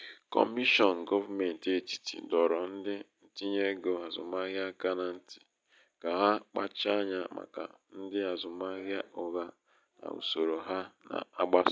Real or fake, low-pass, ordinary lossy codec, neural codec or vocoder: real; none; none; none